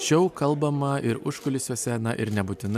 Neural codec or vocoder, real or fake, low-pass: none; real; 14.4 kHz